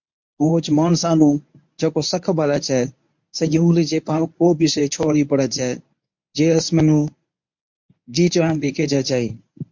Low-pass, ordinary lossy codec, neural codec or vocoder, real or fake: 7.2 kHz; MP3, 48 kbps; codec, 24 kHz, 0.9 kbps, WavTokenizer, medium speech release version 1; fake